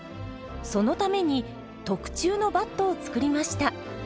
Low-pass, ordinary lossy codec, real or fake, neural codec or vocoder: none; none; real; none